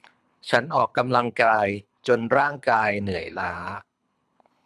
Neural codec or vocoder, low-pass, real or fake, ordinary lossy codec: codec, 24 kHz, 3 kbps, HILCodec; none; fake; none